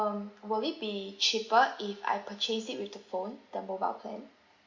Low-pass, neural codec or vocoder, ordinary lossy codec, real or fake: 7.2 kHz; none; Opus, 64 kbps; real